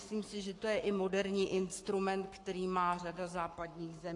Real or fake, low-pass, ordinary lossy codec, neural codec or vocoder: fake; 10.8 kHz; AAC, 48 kbps; codec, 44.1 kHz, 7.8 kbps, Pupu-Codec